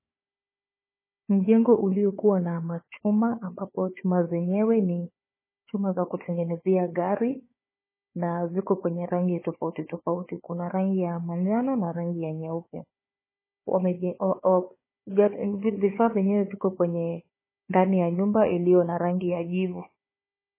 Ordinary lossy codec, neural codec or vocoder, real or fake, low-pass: MP3, 16 kbps; codec, 16 kHz, 4 kbps, FunCodec, trained on Chinese and English, 50 frames a second; fake; 3.6 kHz